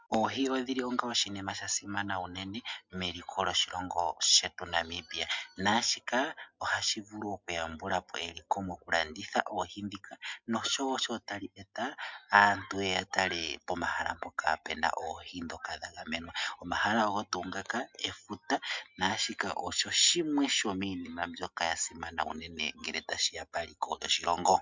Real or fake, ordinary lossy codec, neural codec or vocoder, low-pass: real; MP3, 64 kbps; none; 7.2 kHz